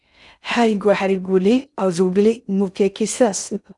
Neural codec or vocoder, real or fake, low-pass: codec, 16 kHz in and 24 kHz out, 0.6 kbps, FocalCodec, streaming, 2048 codes; fake; 10.8 kHz